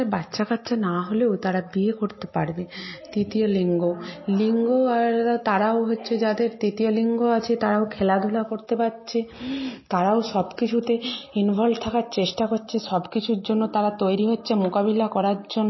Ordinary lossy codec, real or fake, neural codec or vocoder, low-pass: MP3, 24 kbps; real; none; 7.2 kHz